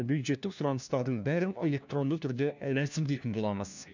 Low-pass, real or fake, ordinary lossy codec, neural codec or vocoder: 7.2 kHz; fake; none; codec, 16 kHz, 1 kbps, FunCodec, trained on LibriTTS, 50 frames a second